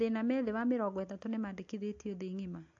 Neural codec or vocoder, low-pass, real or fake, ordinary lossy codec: none; 7.2 kHz; real; AAC, 48 kbps